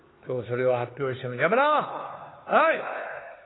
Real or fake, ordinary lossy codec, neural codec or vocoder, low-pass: fake; AAC, 16 kbps; codec, 16 kHz, 4 kbps, X-Codec, HuBERT features, trained on LibriSpeech; 7.2 kHz